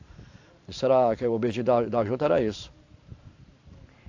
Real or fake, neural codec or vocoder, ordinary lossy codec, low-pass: fake; vocoder, 44.1 kHz, 128 mel bands every 512 samples, BigVGAN v2; AAC, 48 kbps; 7.2 kHz